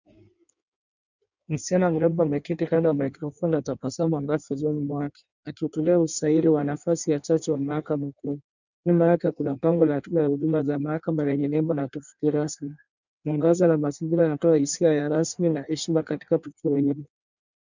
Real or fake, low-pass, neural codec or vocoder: fake; 7.2 kHz; codec, 16 kHz in and 24 kHz out, 1.1 kbps, FireRedTTS-2 codec